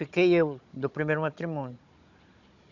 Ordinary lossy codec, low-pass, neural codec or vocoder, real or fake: none; 7.2 kHz; codec, 16 kHz, 16 kbps, FunCodec, trained on Chinese and English, 50 frames a second; fake